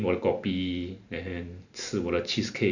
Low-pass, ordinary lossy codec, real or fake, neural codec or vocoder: 7.2 kHz; none; real; none